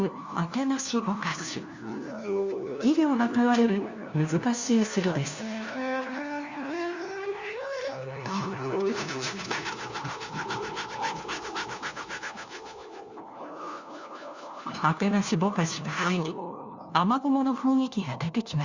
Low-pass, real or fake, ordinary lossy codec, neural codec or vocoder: 7.2 kHz; fake; Opus, 64 kbps; codec, 16 kHz, 1 kbps, FunCodec, trained on LibriTTS, 50 frames a second